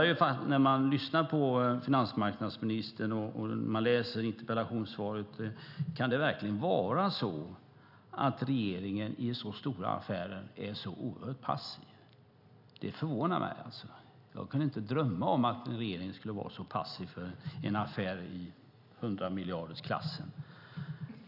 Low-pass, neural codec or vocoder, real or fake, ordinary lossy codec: 5.4 kHz; none; real; none